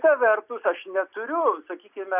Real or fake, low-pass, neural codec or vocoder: real; 3.6 kHz; none